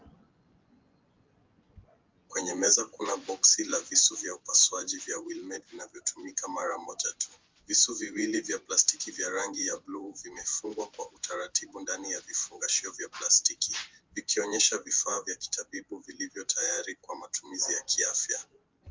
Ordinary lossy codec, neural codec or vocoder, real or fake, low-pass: Opus, 24 kbps; vocoder, 24 kHz, 100 mel bands, Vocos; fake; 7.2 kHz